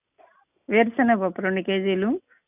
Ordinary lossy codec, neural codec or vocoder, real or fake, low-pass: none; none; real; 3.6 kHz